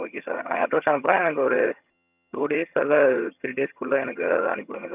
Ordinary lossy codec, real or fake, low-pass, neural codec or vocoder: none; fake; 3.6 kHz; vocoder, 22.05 kHz, 80 mel bands, HiFi-GAN